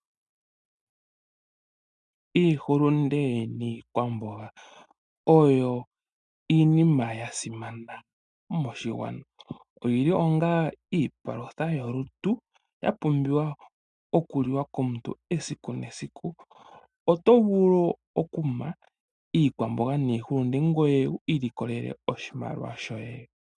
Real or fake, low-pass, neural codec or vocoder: real; 10.8 kHz; none